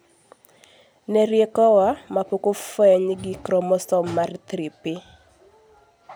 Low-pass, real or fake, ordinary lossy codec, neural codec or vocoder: none; real; none; none